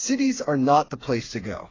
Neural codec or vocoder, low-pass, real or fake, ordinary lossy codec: codec, 16 kHz, 4 kbps, FreqCodec, smaller model; 7.2 kHz; fake; AAC, 32 kbps